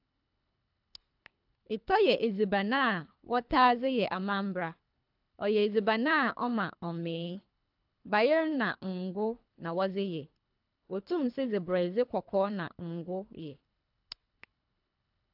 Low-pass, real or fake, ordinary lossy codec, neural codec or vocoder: 5.4 kHz; fake; AAC, 48 kbps; codec, 24 kHz, 3 kbps, HILCodec